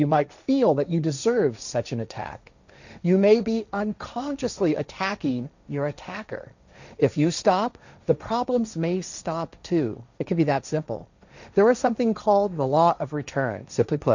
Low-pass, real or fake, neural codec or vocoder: 7.2 kHz; fake; codec, 16 kHz, 1.1 kbps, Voila-Tokenizer